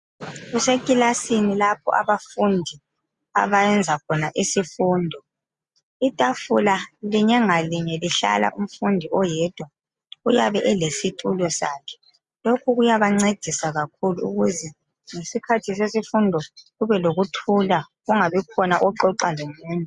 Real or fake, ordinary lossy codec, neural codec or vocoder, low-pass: real; Opus, 64 kbps; none; 10.8 kHz